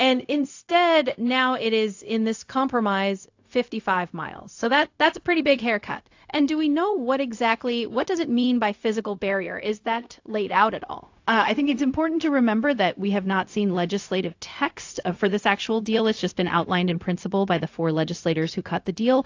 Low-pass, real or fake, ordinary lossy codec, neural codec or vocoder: 7.2 kHz; fake; AAC, 48 kbps; codec, 16 kHz, 0.4 kbps, LongCat-Audio-Codec